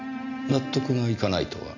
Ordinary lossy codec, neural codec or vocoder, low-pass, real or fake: none; none; 7.2 kHz; real